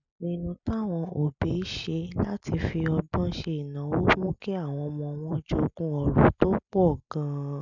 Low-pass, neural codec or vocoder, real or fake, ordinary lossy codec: 7.2 kHz; none; real; none